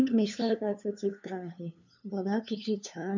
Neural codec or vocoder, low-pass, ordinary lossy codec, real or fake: codec, 16 kHz, 2 kbps, FunCodec, trained on LibriTTS, 25 frames a second; 7.2 kHz; none; fake